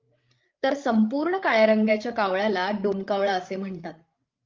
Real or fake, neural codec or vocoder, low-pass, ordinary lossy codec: fake; codec, 16 kHz, 8 kbps, FreqCodec, larger model; 7.2 kHz; Opus, 24 kbps